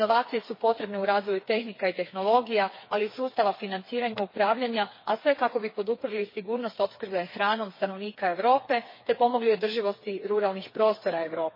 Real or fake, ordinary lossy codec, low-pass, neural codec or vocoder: fake; MP3, 24 kbps; 5.4 kHz; codec, 16 kHz, 4 kbps, FreqCodec, smaller model